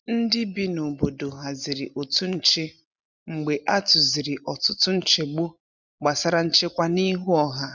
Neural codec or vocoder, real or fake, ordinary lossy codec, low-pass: none; real; none; 7.2 kHz